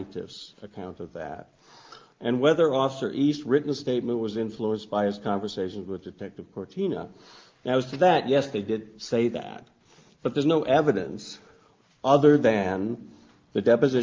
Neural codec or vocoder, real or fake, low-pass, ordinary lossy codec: none; real; 7.2 kHz; Opus, 32 kbps